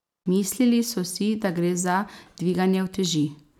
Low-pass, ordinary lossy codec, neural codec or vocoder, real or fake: 19.8 kHz; none; none; real